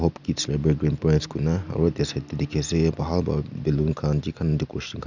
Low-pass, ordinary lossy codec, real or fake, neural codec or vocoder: 7.2 kHz; none; real; none